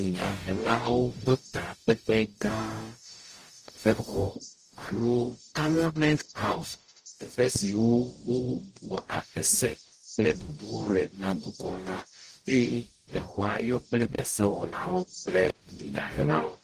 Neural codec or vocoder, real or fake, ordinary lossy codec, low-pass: codec, 44.1 kHz, 0.9 kbps, DAC; fake; Opus, 24 kbps; 14.4 kHz